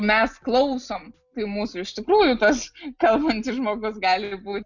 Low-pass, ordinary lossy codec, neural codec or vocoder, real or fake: 7.2 kHz; MP3, 64 kbps; none; real